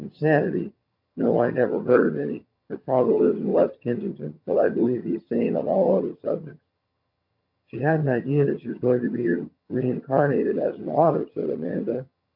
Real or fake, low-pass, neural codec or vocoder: fake; 5.4 kHz; vocoder, 22.05 kHz, 80 mel bands, HiFi-GAN